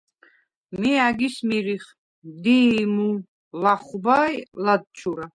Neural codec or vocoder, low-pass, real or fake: none; 9.9 kHz; real